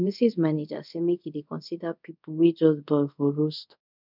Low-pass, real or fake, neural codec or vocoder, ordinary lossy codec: 5.4 kHz; fake; codec, 24 kHz, 0.5 kbps, DualCodec; none